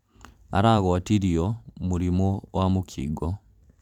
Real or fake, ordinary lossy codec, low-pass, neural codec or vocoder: fake; none; 19.8 kHz; vocoder, 44.1 kHz, 128 mel bands every 512 samples, BigVGAN v2